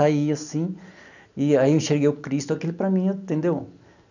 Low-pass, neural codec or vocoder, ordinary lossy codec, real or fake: 7.2 kHz; none; none; real